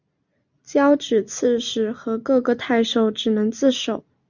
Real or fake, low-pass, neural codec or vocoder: real; 7.2 kHz; none